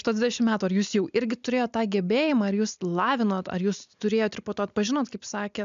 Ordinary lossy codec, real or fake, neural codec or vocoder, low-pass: MP3, 64 kbps; real; none; 7.2 kHz